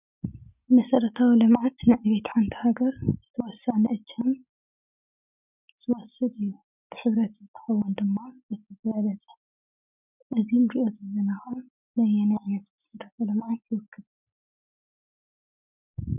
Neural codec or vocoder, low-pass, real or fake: none; 3.6 kHz; real